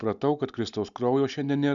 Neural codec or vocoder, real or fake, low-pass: none; real; 7.2 kHz